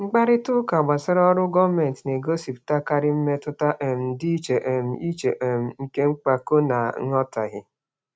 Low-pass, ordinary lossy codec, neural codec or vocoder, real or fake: none; none; none; real